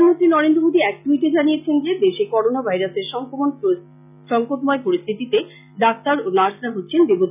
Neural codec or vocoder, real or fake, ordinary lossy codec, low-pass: none; real; none; 3.6 kHz